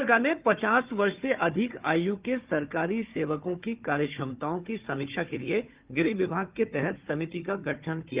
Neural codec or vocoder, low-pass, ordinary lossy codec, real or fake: codec, 16 kHz, 4 kbps, FunCodec, trained on Chinese and English, 50 frames a second; 3.6 kHz; Opus, 24 kbps; fake